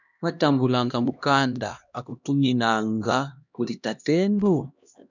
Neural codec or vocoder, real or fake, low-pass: codec, 16 kHz, 1 kbps, X-Codec, HuBERT features, trained on LibriSpeech; fake; 7.2 kHz